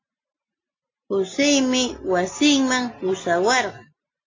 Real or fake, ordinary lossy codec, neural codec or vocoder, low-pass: real; AAC, 32 kbps; none; 7.2 kHz